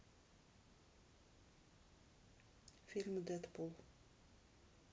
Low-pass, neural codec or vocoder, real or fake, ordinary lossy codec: none; none; real; none